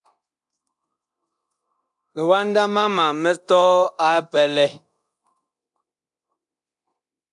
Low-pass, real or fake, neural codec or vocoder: 10.8 kHz; fake; codec, 24 kHz, 0.9 kbps, DualCodec